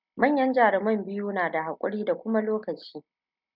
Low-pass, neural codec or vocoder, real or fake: 5.4 kHz; none; real